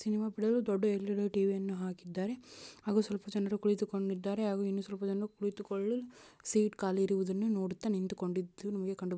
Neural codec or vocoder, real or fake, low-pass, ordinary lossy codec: none; real; none; none